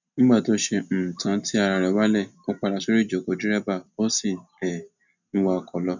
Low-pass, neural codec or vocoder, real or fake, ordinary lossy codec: 7.2 kHz; none; real; none